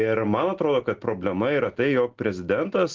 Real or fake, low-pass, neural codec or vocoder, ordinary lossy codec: real; 7.2 kHz; none; Opus, 16 kbps